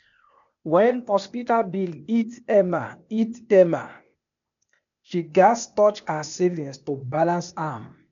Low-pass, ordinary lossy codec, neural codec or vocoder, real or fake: 7.2 kHz; none; codec, 16 kHz, 0.8 kbps, ZipCodec; fake